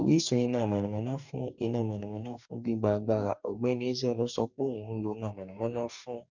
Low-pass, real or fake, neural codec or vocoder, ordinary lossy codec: 7.2 kHz; fake; codec, 44.1 kHz, 2.6 kbps, DAC; none